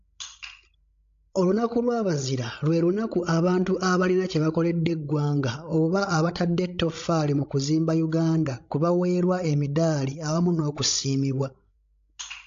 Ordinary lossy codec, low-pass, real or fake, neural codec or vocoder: AAC, 48 kbps; 7.2 kHz; fake; codec, 16 kHz, 16 kbps, FreqCodec, larger model